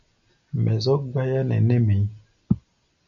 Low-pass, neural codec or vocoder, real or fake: 7.2 kHz; none; real